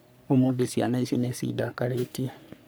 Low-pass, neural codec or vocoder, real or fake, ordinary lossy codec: none; codec, 44.1 kHz, 3.4 kbps, Pupu-Codec; fake; none